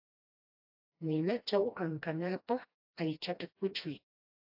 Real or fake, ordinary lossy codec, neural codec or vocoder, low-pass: fake; AAC, 48 kbps; codec, 16 kHz, 1 kbps, FreqCodec, smaller model; 5.4 kHz